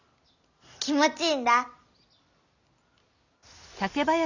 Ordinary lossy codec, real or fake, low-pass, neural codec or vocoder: none; real; 7.2 kHz; none